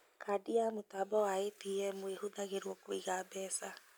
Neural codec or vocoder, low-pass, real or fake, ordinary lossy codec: none; none; real; none